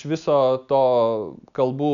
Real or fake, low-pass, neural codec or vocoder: real; 7.2 kHz; none